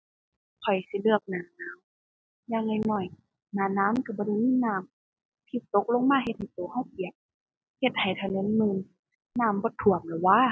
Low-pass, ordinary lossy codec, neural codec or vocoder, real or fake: none; none; none; real